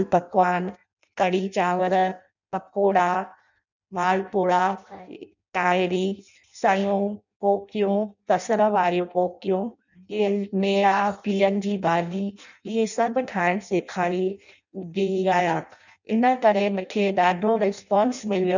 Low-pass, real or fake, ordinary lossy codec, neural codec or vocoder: 7.2 kHz; fake; none; codec, 16 kHz in and 24 kHz out, 0.6 kbps, FireRedTTS-2 codec